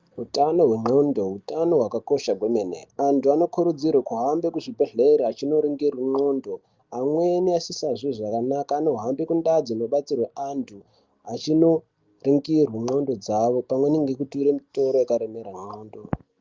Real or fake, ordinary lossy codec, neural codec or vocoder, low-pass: real; Opus, 32 kbps; none; 7.2 kHz